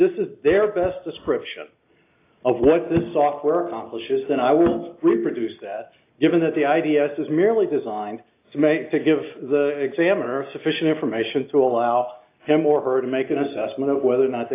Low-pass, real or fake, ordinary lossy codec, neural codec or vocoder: 3.6 kHz; real; AAC, 24 kbps; none